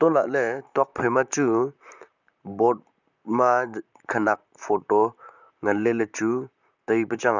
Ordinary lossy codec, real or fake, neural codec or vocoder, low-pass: none; fake; vocoder, 44.1 kHz, 128 mel bands, Pupu-Vocoder; 7.2 kHz